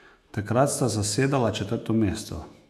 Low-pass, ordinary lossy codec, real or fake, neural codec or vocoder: 14.4 kHz; AAC, 96 kbps; fake; autoencoder, 48 kHz, 128 numbers a frame, DAC-VAE, trained on Japanese speech